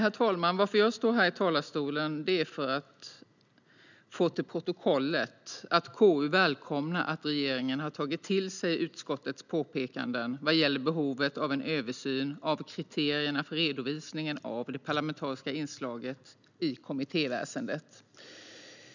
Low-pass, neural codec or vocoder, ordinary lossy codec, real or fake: 7.2 kHz; none; none; real